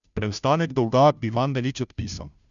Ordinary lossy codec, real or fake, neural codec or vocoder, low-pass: none; fake; codec, 16 kHz, 0.5 kbps, FunCodec, trained on Chinese and English, 25 frames a second; 7.2 kHz